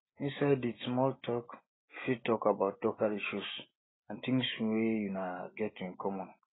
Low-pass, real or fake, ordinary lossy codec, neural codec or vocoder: 7.2 kHz; real; AAC, 16 kbps; none